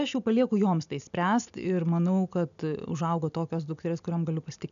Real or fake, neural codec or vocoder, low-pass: real; none; 7.2 kHz